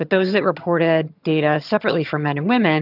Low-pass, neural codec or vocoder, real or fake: 5.4 kHz; vocoder, 22.05 kHz, 80 mel bands, HiFi-GAN; fake